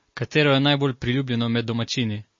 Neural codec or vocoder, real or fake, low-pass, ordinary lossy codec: none; real; 7.2 kHz; MP3, 32 kbps